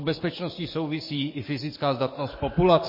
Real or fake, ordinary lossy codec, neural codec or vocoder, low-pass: fake; MP3, 24 kbps; codec, 44.1 kHz, 7.8 kbps, DAC; 5.4 kHz